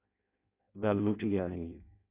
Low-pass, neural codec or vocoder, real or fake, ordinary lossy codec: 3.6 kHz; codec, 16 kHz in and 24 kHz out, 0.6 kbps, FireRedTTS-2 codec; fake; Opus, 64 kbps